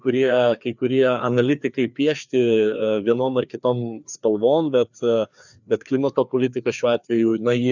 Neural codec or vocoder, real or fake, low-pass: codec, 16 kHz, 2 kbps, FreqCodec, larger model; fake; 7.2 kHz